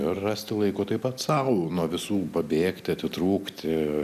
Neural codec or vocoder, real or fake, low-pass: none; real; 14.4 kHz